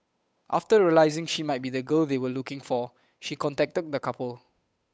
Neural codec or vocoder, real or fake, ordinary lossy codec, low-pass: codec, 16 kHz, 8 kbps, FunCodec, trained on Chinese and English, 25 frames a second; fake; none; none